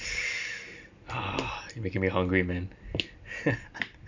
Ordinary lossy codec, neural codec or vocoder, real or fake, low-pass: MP3, 64 kbps; none; real; 7.2 kHz